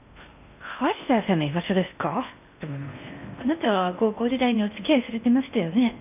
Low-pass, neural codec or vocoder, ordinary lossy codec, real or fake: 3.6 kHz; codec, 16 kHz in and 24 kHz out, 0.6 kbps, FocalCodec, streaming, 4096 codes; none; fake